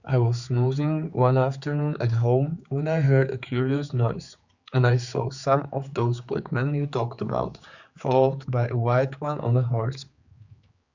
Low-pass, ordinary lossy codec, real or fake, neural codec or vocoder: 7.2 kHz; Opus, 64 kbps; fake; codec, 16 kHz, 4 kbps, X-Codec, HuBERT features, trained on general audio